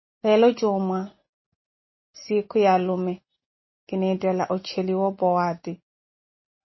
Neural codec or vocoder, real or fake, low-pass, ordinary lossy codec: none; real; 7.2 kHz; MP3, 24 kbps